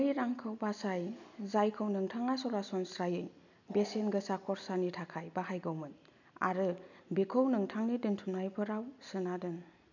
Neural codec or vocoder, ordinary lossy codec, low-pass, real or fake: none; none; 7.2 kHz; real